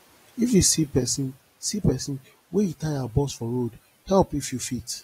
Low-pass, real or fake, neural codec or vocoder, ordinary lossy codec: 19.8 kHz; real; none; AAC, 48 kbps